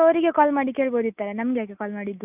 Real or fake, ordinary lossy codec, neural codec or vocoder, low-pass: real; none; none; 3.6 kHz